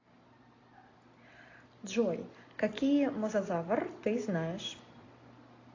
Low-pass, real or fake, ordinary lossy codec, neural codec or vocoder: 7.2 kHz; real; AAC, 32 kbps; none